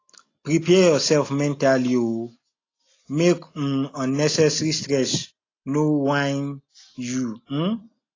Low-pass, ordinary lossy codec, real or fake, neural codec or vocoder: 7.2 kHz; AAC, 32 kbps; real; none